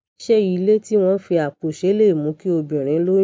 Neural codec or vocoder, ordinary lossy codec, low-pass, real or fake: none; none; none; real